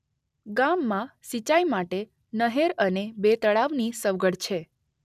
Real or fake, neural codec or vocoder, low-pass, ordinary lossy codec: real; none; 14.4 kHz; none